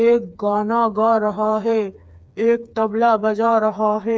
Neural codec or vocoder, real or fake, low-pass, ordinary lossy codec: codec, 16 kHz, 4 kbps, FreqCodec, smaller model; fake; none; none